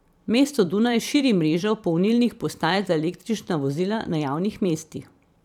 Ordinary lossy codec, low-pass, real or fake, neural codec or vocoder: none; 19.8 kHz; fake; vocoder, 44.1 kHz, 128 mel bands every 512 samples, BigVGAN v2